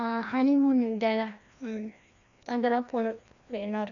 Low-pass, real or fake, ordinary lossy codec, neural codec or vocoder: 7.2 kHz; fake; none; codec, 16 kHz, 1 kbps, FreqCodec, larger model